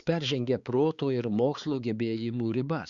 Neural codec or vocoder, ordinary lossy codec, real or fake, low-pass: codec, 16 kHz, 4 kbps, X-Codec, HuBERT features, trained on balanced general audio; Opus, 64 kbps; fake; 7.2 kHz